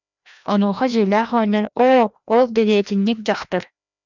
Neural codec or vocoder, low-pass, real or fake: codec, 16 kHz, 1 kbps, FreqCodec, larger model; 7.2 kHz; fake